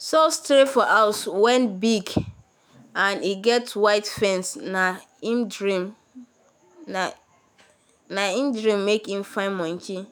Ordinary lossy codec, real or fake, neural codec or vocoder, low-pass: none; fake; autoencoder, 48 kHz, 128 numbers a frame, DAC-VAE, trained on Japanese speech; none